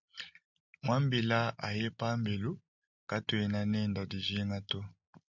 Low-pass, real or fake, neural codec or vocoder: 7.2 kHz; real; none